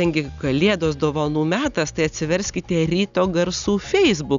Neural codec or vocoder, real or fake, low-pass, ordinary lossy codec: none; real; 7.2 kHz; Opus, 64 kbps